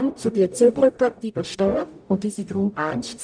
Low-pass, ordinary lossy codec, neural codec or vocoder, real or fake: 9.9 kHz; none; codec, 44.1 kHz, 0.9 kbps, DAC; fake